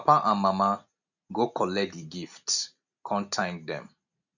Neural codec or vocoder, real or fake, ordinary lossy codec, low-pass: none; real; none; 7.2 kHz